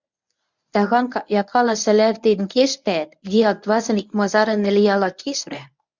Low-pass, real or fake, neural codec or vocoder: 7.2 kHz; fake; codec, 24 kHz, 0.9 kbps, WavTokenizer, medium speech release version 1